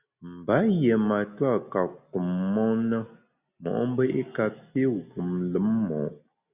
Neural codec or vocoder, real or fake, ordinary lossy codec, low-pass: none; real; Opus, 64 kbps; 3.6 kHz